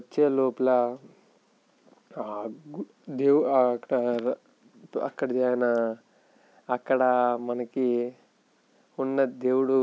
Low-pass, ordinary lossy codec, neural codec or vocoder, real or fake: none; none; none; real